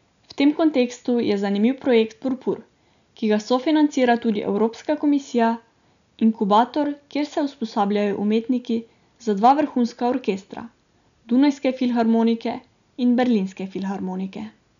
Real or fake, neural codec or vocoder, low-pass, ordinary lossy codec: real; none; 7.2 kHz; none